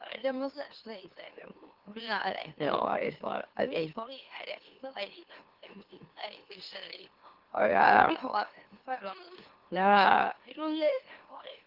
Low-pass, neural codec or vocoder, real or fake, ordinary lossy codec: 5.4 kHz; autoencoder, 44.1 kHz, a latent of 192 numbers a frame, MeloTTS; fake; Opus, 16 kbps